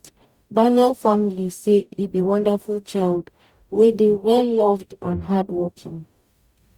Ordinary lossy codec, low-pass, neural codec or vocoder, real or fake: Opus, 64 kbps; 19.8 kHz; codec, 44.1 kHz, 0.9 kbps, DAC; fake